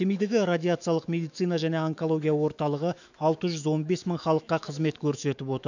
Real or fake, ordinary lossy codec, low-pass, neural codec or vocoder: fake; none; 7.2 kHz; autoencoder, 48 kHz, 128 numbers a frame, DAC-VAE, trained on Japanese speech